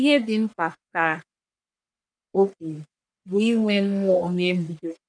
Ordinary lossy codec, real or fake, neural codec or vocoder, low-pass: none; fake; codec, 44.1 kHz, 1.7 kbps, Pupu-Codec; 9.9 kHz